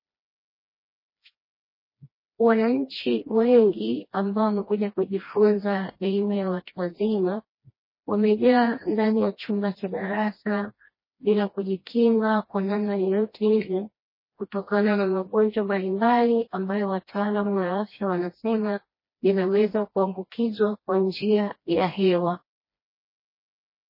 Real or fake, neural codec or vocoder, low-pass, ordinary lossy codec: fake; codec, 16 kHz, 1 kbps, FreqCodec, smaller model; 5.4 kHz; MP3, 24 kbps